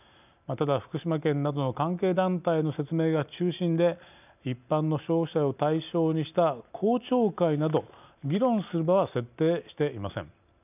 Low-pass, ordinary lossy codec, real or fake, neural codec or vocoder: 3.6 kHz; none; real; none